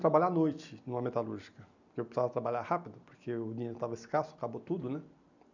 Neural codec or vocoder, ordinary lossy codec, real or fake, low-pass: none; none; real; 7.2 kHz